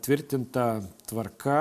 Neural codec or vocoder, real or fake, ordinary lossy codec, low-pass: none; real; MP3, 96 kbps; 14.4 kHz